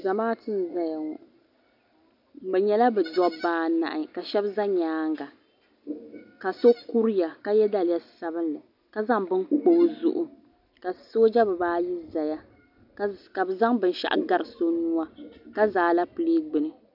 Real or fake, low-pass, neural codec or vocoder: real; 5.4 kHz; none